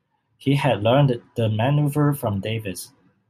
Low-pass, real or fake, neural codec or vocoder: 14.4 kHz; real; none